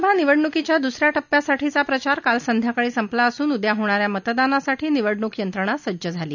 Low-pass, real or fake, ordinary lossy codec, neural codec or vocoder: 7.2 kHz; real; none; none